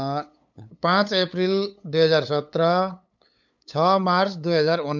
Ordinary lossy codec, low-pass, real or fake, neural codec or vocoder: none; 7.2 kHz; fake; codec, 16 kHz, 8 kbps, FunCodec, trained on LibriTTS, 25 frames a second